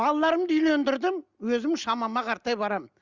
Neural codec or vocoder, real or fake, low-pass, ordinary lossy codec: none; real; 7.2 kHz; Opus, 32 kbps